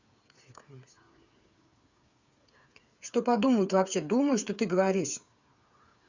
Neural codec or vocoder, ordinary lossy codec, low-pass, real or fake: codec, 16 kHz, 8 kbps, FreqCodec, smaller model; Opus, 64 kbps; 7.2 kHz; fake